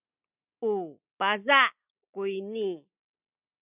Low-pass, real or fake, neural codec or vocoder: 3.6 kHz; real; none